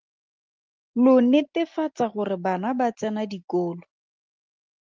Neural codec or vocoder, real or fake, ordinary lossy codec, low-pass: none; real; Opus, 32 kbps; 7.2 kHz